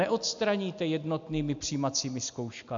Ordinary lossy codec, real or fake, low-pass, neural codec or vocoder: AAC, 64 kbps; real; 7.2 kHz; none